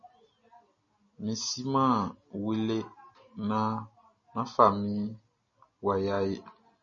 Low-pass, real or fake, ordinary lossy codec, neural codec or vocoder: 7.2 kHz; real; MP3, 32 kbps; none